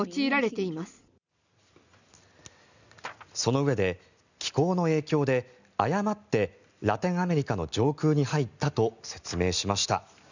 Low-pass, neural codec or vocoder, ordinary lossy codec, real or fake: 7.2 kHz; none; none; real